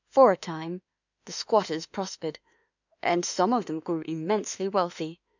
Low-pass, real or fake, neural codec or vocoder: 7.2 kHz; fake; autoencoder, 48 kHz, 32 numbers a frame, DAC-VAE, trained on Japanese speech